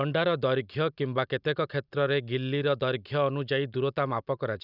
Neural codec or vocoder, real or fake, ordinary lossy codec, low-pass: none; real; none; 5.4 kHz